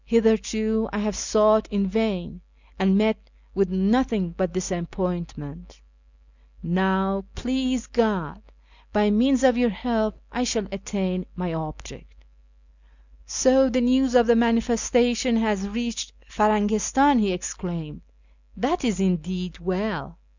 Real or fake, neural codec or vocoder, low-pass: real; none; 7.2 kHz